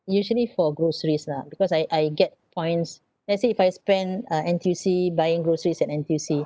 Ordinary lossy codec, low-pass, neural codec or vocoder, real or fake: none; none; none; real